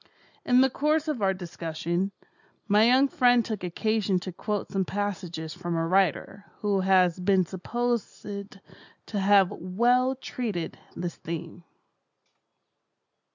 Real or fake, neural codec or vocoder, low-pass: real; none; 7.2 kHz